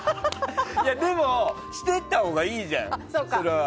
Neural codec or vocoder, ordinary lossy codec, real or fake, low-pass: none; none; real; none